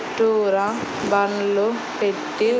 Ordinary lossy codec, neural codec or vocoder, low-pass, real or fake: none; none; none; real